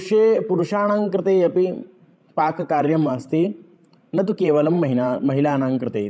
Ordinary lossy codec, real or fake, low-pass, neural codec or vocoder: none; fake; none; codec, 16 kHz, 16 kbps, FreqCodec, larger model